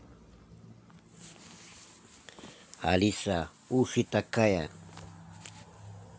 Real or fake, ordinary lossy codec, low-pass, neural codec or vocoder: real; none; none; none